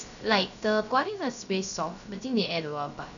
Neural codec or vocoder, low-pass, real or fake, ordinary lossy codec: codec, 16 kHz, 0.3 kbps, FocalCodec; 7.2 kHz; fake; none